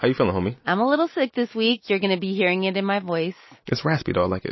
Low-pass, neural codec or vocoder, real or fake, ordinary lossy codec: 7.2 kHz; none; real; MP3, 24 kbps